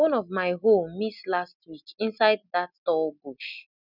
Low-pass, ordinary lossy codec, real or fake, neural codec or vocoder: 5.4 kHz; none; real; none